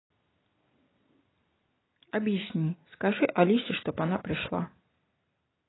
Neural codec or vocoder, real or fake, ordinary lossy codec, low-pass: none; real; AAC, 16 kbps; 7.2 kHz